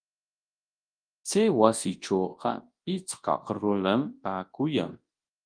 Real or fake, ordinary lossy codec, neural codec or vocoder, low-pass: fake; Opus, 24 kbps; codec, 24 kHz, 0.9 kbps, WavTokenizer, large speech release; 9.9 kHz